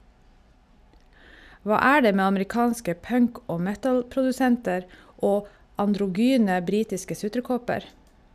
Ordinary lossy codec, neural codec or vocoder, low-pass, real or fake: none; none; 14.4 kHz; real